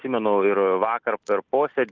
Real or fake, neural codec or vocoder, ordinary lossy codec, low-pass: real; none; Opus, 24 kbps; 7.2 kHz